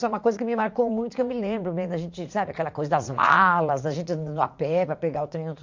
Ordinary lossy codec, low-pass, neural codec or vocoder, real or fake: MP3, 48 kbps; 7.2 kHz; vocoder, 44.1 kHz, 80 mel bands, Vocos; fake